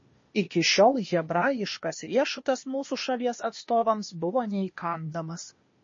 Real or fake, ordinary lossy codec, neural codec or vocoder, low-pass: fake; MP3, 32 kbps; codec, 16 kHz, 0.8 kbps, ZipCodec; 7.2 kHz